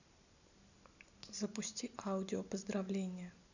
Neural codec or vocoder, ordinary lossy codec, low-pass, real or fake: none; none; 7.2 kHz; real